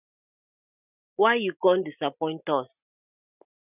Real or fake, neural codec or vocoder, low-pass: real; none; 3.6 kHz